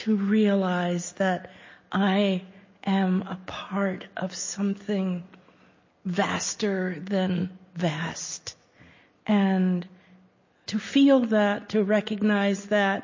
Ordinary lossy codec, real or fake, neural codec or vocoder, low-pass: MP3, 32 kbps; real; none; 7.2 kHz